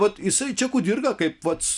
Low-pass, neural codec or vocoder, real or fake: 10.8 kHz; none; real